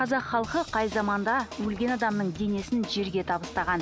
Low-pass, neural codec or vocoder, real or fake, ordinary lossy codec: none; none; real; none